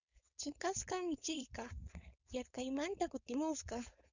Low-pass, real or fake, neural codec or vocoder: 7.2 kHz; fake; codec, 16 kHz, 4.8 kbps, FACodec